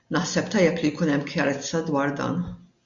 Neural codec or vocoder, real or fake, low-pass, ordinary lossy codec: none; real; 7.2 kHz; MP3, 64 kbps